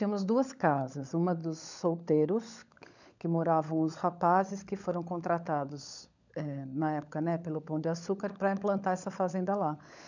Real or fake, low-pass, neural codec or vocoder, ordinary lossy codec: fake; 7.2 kHz; codec, 16 kHz, 16 kbps, FunCodec, trained on LibriTTS, 50 frames a second; none